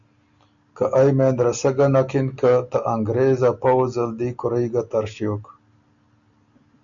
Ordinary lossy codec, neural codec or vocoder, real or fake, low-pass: MP3, 96 kbps; none; real; 7.2 kHz